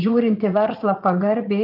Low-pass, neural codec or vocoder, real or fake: 5.4 kHz; vocoder, 44.1 kHz, 80 mel bands, Vocos; fake